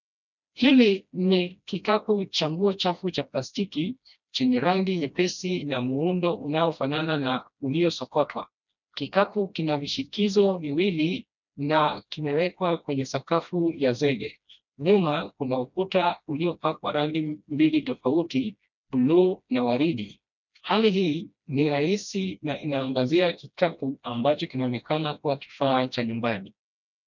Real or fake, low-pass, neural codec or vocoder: fake; 7.2 kHz; codec, 16 kHz, 1 kbps, FreqCodec, smaller model